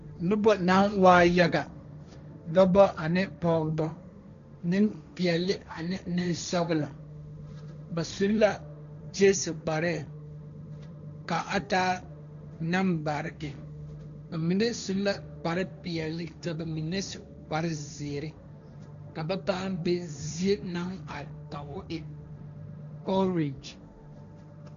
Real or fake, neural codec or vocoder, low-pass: fake; codec, 16 kHz, 1.1 kbps, Voila-Tokenizer; 7.2 kHz